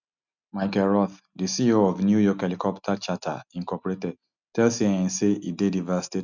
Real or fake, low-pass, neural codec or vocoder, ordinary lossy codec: real; 7.2 kHz; none; none